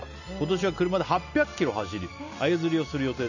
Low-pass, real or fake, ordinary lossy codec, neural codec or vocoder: 7.2 kHz; real; none; none